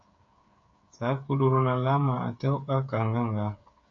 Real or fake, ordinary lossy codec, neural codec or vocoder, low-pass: fake; AAC, 64 kbps; codec, 16 kHz, 8 kbps, FreqCodec, smaller model; 7.2 kHz